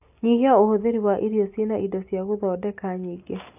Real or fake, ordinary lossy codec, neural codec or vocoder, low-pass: real; AAC, 32 kbps; none; 3.6 kHz